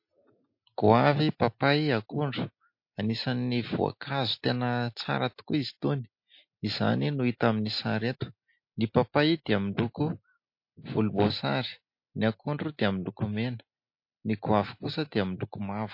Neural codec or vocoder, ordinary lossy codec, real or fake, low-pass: none; MP3, 32 kbps; real; 5.4 kHz